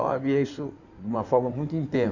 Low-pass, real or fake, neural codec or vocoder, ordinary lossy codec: 7.2 kHz; fake; codec, 16 kHz in and 24 kHz out, 2.2 kbps, FireRedTTS-2 codec; none